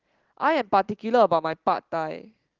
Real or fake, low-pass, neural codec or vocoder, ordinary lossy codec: real; 7.2 kHz; none; Opus, 16 kbps